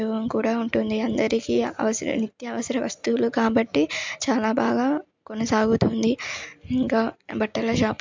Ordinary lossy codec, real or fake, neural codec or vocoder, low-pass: MP3, 64 kbps; real; none; 7.2 kHz